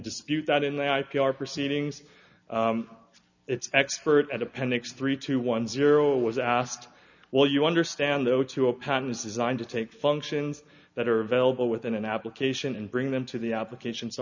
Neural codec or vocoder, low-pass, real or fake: none; 7.2 kHz; real